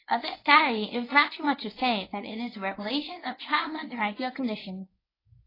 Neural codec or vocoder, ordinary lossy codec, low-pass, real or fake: codec, 24 kHz, 0.9 kbps, WavTokenizer, medium speech release version 1; AAC, 24 kbps; 5.4 kHz; fake